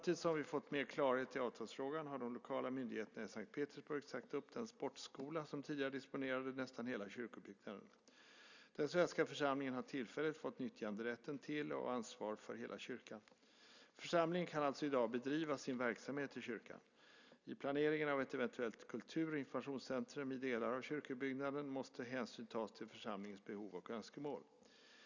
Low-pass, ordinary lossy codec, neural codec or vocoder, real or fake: 7.2 kHz; none; none; real